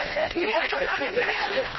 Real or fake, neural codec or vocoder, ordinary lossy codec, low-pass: fake; codec, 16 kHz, 1 kbps, FreqCodec, smaller model; MP3, 24 kbps; 7.2 kHz